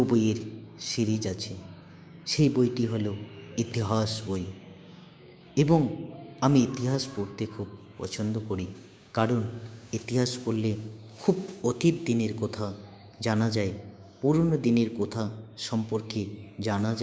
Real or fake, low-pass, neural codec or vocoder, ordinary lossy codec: fake; none; codec, 16 kHz, 6 kbps, DAC; none